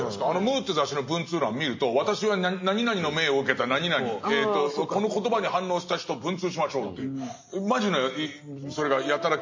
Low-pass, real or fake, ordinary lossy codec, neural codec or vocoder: 7.2 kHz; real; MP3, 32 kbps; none